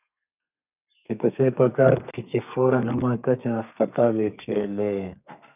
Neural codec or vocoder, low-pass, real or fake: codec, 32 kHz, 1.9 kbps, SNAC; 3.6 kHz; fake